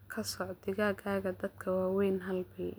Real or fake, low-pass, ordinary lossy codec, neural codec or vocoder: real; none; none; none